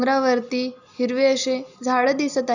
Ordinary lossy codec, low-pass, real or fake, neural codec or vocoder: none; 7.2 kHz; real; none